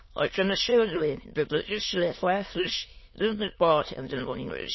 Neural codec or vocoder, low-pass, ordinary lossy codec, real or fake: autoencoder, 22.05 kHz, a latent of 192 numbers a frame, VITS, trained on many speakers; 7.2 kHz; MP3, 24 kbps; fake